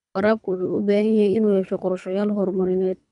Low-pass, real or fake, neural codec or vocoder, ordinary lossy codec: 10.8 kHz; fake; codec, 24 kHz, 3 kbps, HILCodec; none